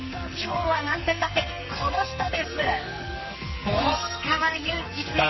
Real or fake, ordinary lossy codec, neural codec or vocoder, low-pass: fake; MP3, 24 kbps; codec, 44.1 kHz, 2.6 kbps, SNAC; 7.2 kHz